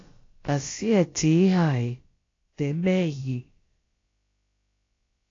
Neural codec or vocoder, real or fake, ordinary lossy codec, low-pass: codec, 16 kHz, about 1 kbps, DyCAST, with the encoder's durations; fake; AAC, 32 kbps; 7.2 kHz